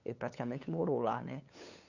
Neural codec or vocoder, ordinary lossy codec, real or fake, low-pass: codec, 16 kHz, 8 kbps, FunCodec, trained on LibriTTS, 25 frames a second; none; fake; 7.2 kHz